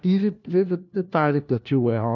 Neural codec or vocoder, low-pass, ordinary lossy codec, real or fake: codec, 16 kHz, 1 kbps, FunCodec, trained on LibriTTS, 50 frames a second; 7.2 kHz; none; fake